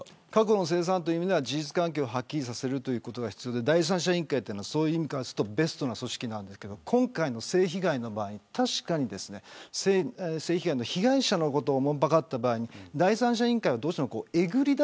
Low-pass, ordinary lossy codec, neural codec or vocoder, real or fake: none; none; none; real